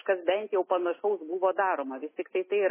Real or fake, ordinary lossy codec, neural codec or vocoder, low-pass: real; MP3, 16 kbps; none; 3.6 kHz